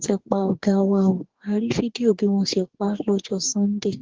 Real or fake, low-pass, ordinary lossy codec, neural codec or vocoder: fake; 7.2 kHz; Opus, 16 kbps; codec, 44.1 kHz, 2.6 kbps, DAC